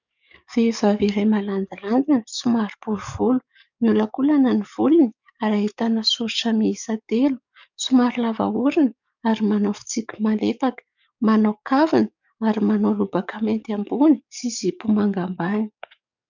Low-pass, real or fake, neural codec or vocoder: 7.2 kHz; fake; codec, 44.1 kHz, 7.8 kbps, DAC